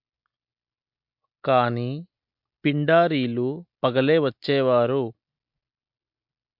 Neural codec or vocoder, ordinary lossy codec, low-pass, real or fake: none; MP3, 48 kbps; 5.4 kHz; real